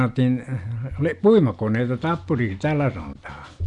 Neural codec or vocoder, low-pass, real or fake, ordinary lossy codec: none; 10.8 kHz; real; none